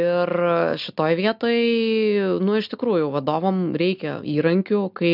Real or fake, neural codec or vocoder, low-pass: real; none; 5.4 kHz